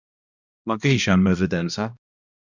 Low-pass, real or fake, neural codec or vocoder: 7.2 kHz; fake; codec, 16 kHz, 1 kbps, X-Codec, HuBERT features, trained on balanced general audio